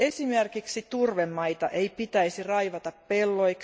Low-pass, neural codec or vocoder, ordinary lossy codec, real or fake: none; none; none; real